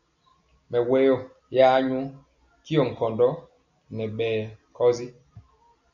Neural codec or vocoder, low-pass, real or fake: none; 7.2 kHz; real